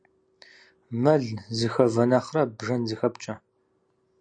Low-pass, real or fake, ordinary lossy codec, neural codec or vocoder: 9.9 kHz; real; AAC, 64 kbps; none